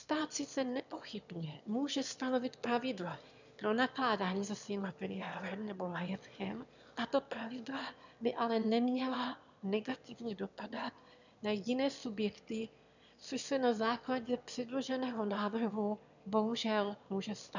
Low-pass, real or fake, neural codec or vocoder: 7.2 kHz; fake; autoencoder, 22.05 kHz, a latent of 192 numbers a frame, VITS, trained on one speaker